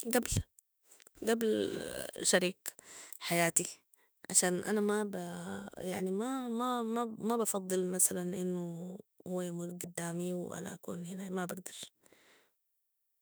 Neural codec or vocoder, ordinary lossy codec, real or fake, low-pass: autoencoder, 48 kHz, 32 numbers a frame, DAC-VAE, trained on Japanese speech; none; fake; none